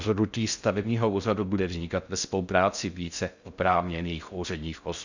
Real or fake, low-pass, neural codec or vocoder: fake; 7.2 kHz; codec, 16 kHz in and 24 kHz out, 0.6 kbps, FocalCodec, streaming, 2048 codes